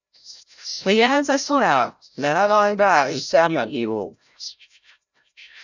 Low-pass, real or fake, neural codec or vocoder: 7.2 kHz; fake; codec, 16 kHz, 0.5 kbps, FreqCodec, larger model